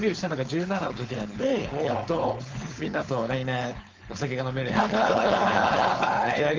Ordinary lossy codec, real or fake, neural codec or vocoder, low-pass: Opus, 32 kbps; fake; codec, 16 kHz, 4.8 kbps, FACodec; 7.2 kHz